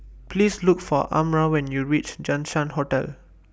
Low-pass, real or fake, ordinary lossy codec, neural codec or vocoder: none; real; none; none